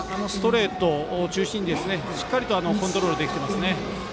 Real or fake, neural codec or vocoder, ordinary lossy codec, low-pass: real; none; none; none